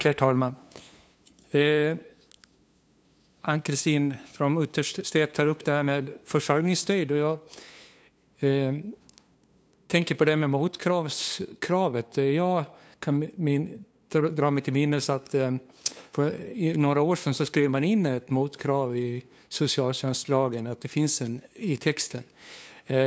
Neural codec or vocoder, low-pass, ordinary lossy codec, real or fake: codec, 16 kHz, 2 kbps, FunCodec, trained on LibriTTS, 25 frames a second; none; none; fake